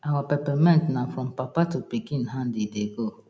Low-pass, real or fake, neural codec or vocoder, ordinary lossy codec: none; real; none; none